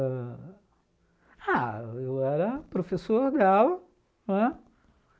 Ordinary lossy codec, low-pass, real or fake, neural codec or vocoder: none; none; real; none